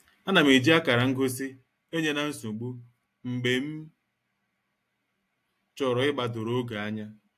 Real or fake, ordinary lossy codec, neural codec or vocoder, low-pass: real; AAC, 64 kbps; none; 14.4 kHz